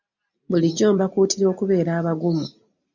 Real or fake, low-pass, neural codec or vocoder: real; 7.2 kHz; none